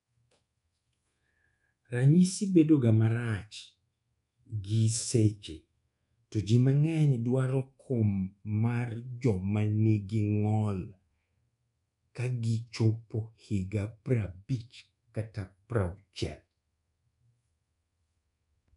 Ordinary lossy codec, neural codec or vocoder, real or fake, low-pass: none; codec, 24 kHz, 1.2 kbps, DualCodec; fake; 10.8 kHz